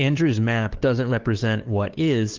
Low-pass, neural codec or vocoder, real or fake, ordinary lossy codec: 7.2 kHz; codec, 16 kHz, 2 kbps, FunCodec, trained on LibriTTS, 25 frames a second; fake; Opus, 16 kbps